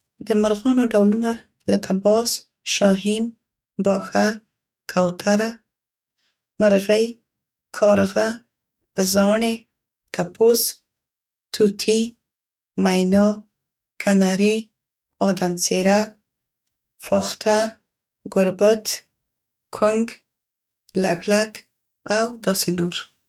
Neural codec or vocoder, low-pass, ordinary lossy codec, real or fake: codec, 44.1 kHz, 2.6 kbps, DAC; 19.8 kHz; none; fake